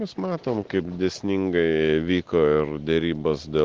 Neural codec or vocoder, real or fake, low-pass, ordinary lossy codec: none; real; 7.2 kHz; Opus, 16 kbps